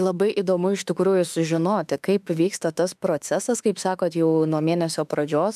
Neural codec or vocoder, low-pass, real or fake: autoencoder, 48 kHz, 32 numbers a frame, DAC-VAE, trained on Japanese speech; 14.4 kHz; fake